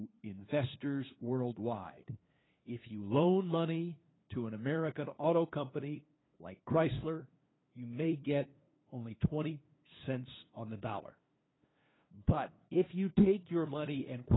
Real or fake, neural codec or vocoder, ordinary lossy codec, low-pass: fake; codec, 16 kHz, 2 kbps, FunCodec, trained on LibriTTS, 25 frames a second; AAC, 16 kbps; 7.2 kHz